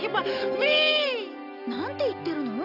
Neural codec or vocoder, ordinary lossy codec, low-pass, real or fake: none; none; 5.4 kHz; real